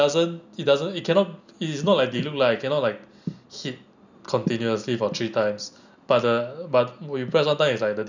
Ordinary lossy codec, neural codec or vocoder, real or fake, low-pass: none; none; real; 7.2 kHz